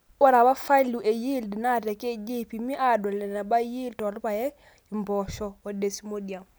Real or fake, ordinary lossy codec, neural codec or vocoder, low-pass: real; none; none; none